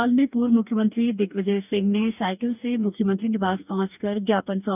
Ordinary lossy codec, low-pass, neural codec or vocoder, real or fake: none; 3.6 kHz; codec, 44.1 kHz, 2.6 kbps, DAC; fake